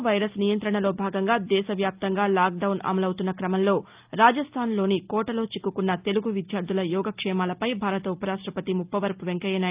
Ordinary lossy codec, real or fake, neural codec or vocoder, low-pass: Opus, 24 kbps; real; none; 3.6 kHz